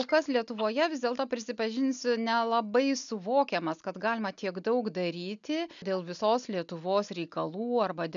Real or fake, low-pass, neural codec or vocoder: real; 7.2 kHz; none